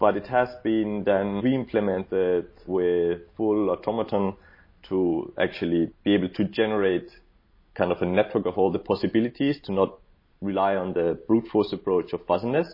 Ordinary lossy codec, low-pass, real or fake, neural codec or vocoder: MP3, 24 kbps; 5.4 kHz; real; none